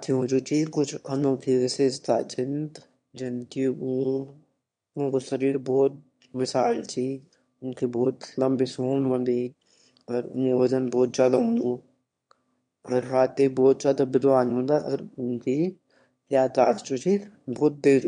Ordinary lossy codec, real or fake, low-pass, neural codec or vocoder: MP3, 64 kbps; fake; 9.9 kHz; autoencoder, 22.05 kHz, a latent of 192 numbers a frame, VITS, trained on one speaker